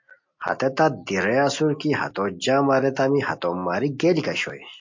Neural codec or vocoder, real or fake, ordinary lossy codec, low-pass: none; real; MP3, 48 kbps; 7.2 kHz